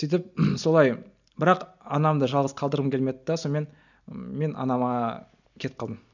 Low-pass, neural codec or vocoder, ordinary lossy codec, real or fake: 7.2 kHz; none; none; real